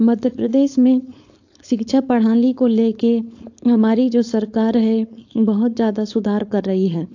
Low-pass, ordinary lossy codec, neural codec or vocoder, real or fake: 7.2 kHz; AAC, 48 kbps; codec, 16 kHz, 4.8 kbps, FACodec; fake